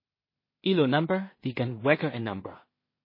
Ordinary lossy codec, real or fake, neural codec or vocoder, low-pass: MP3, 24 kbps; fake; codec, 16 kHz in and 24 kHz out, 0.4 kbps, LongCat-Audio-Codec, two codebook decoder; 5.4 kHz